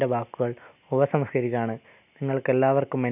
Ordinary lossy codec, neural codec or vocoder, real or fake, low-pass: none; none; real; 3.6 kHz